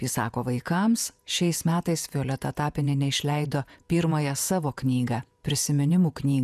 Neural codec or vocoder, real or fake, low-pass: vocoder, 48 kHz, 128 mel bands, Vocos; fake; 14.4 kHz